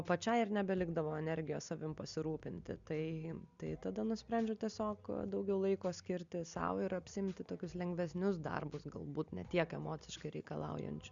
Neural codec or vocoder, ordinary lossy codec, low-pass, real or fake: none; Opus, 64 kbps; 7.2 kHz; real